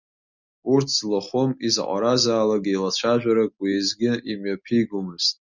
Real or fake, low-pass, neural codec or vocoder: real; 7.2 kHz; none